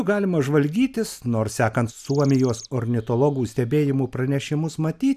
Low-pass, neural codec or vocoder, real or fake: 14.4 kHz; none; real